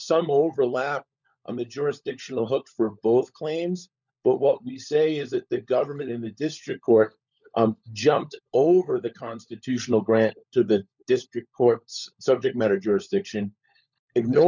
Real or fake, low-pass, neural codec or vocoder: fake; 7.2 kHz; codec, 16 kHz, 16 kbps, FunCodec, trained on LibriTTS, 50 frames a second